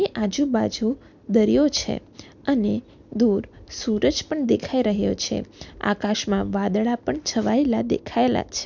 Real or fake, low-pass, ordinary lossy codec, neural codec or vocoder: real; 7.2 kHz; none; none